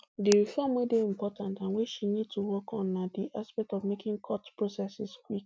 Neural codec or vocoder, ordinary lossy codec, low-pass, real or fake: none; none; none; real